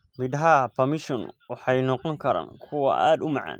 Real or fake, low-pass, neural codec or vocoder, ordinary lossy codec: real; 19.8 kHz; none; Opus, 32 kbps